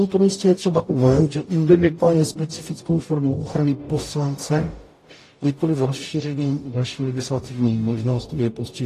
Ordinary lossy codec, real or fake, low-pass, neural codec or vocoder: AAC, 48 kbps; fake; 14.4 kHz; codec, 44.1 kHz, 0.9 kbps, DAC